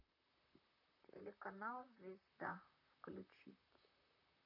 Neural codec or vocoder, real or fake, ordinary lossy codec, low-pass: vocoder, 44.1 kHz, 128 mel bands, Pupu-Vocoder; fake; MP3, 48 kbps; 5.4 kHz